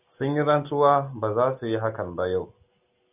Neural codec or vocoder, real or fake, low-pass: none; real; 3.6 kHz